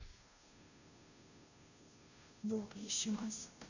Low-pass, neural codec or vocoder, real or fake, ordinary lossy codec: 7.2 kHz; codec, 16 kHz, 0.5 kbps, FunCodec, trained on Chinese and English, 25 frames a second; fake; none